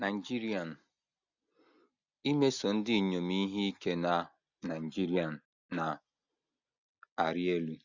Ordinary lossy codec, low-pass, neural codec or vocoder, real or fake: none; 7.2 kHz; none; real